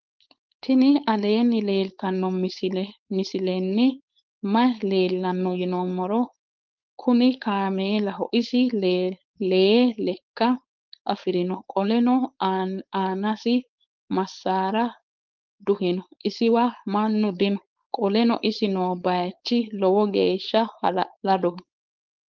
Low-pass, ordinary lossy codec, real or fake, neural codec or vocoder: 7.2 kHz; Opus, 32 kbps; fake; codec, 16 kHz, 4.8 kbps, FACodec